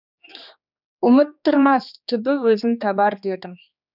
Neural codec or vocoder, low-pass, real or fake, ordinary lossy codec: codec, 16 kHz, 2 kbps, X-Codec, HuBERT features, trained on general audio; 5.4 kHz; fake; none